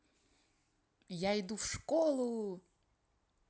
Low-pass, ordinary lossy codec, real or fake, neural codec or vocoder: none; none; real; none